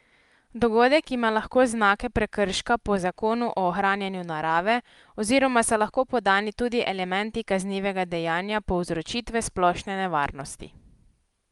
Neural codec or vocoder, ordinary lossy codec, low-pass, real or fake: none; Opus, 32 kbps; 10.8 kHz; real